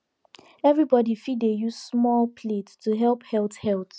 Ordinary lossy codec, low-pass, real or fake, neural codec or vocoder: none; none; real; none